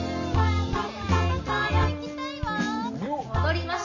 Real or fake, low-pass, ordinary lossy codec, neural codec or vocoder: real; 7.2 kHz; none; none